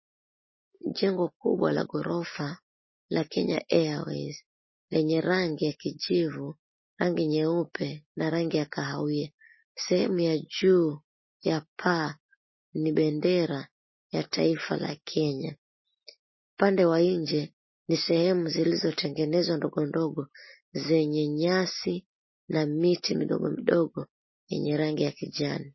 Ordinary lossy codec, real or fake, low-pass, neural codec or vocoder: MP3, 24 kbps; real; 7.2 kHz; none